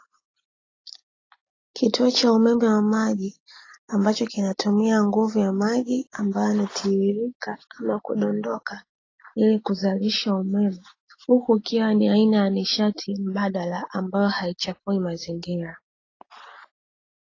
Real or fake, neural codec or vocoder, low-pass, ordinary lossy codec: real; none; 7.2 kHz; AAC, 32 kbps